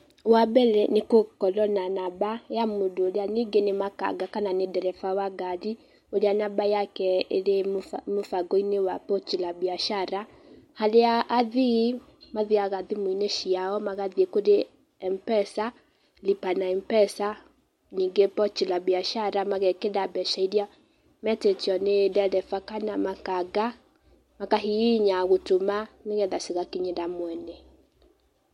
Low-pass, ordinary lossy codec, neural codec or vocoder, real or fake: 19.8 kHz; MP3, 64 kbps; none; real